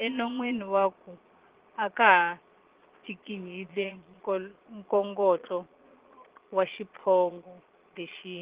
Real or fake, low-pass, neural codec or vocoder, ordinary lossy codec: fake; 3.6 kHz; vocoder, 22.05 kHz, 80 mel bands, Vocos; Opus, 16 kbps